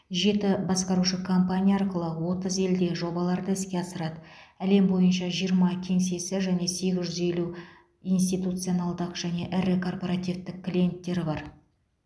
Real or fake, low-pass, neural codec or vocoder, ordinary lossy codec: real; none; none; none